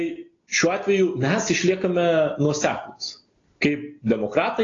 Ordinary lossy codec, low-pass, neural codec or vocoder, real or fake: AAC, 32 kbps; 7.2 kHz; none; real